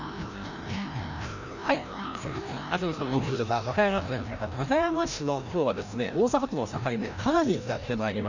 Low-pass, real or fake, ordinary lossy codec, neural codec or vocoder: 7.2 kHz; fake; none; codec, 16 kHz, 1 kbps, FreqCodec, larger model